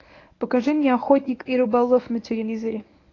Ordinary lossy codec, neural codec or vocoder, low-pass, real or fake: AAC, 32 kbps; codec, 24 kHz, 0.9 kbps, WavTokenizer, medium speech release version 1; 7.2 kHz; fake